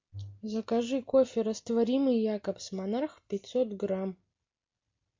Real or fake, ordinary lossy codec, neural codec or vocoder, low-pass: real; MP3, 48 kbps; none; 7.2 kHz